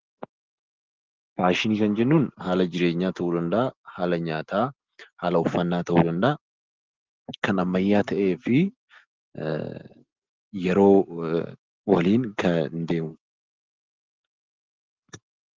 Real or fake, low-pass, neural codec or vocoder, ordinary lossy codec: real; 7.2 kHz; none; Opus, 16 kbps